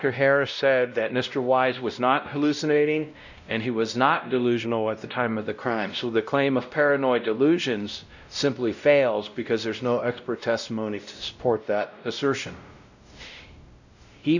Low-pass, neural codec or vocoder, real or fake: 7.2 kHz; codec, 16 kHz, 0.5 kbps, X-Codec, WavLM features, trained on Multilingual LibriSpeech; fake